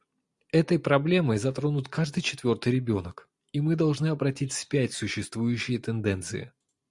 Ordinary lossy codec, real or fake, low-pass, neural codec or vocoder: Opus, 64 kbps; real; 10.8 kHz; none